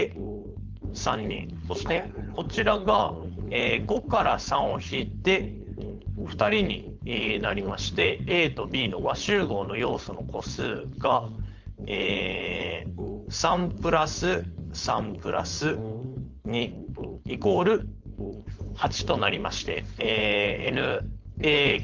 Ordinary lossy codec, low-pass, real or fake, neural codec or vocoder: Opus, 32 kbps; 7.2 kHz; fake; codec, 16 kHz, 4.8 kbps, FACodec